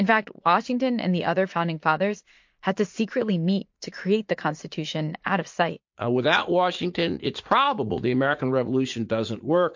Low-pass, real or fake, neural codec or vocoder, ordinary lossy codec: 7.2 kHz; fake; vocoder, 44.1 kHz, 80 mel bands, Vocos; MP3, 48 kbps